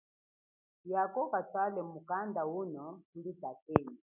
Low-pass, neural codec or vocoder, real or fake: 3.6 kHz; none; real